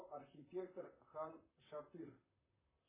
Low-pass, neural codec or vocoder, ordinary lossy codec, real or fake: 3.6 kHz; vocoder, 44.1 kHz, 128 mel bands, Pupu-Vocoder; MP3, 16 kbps; fake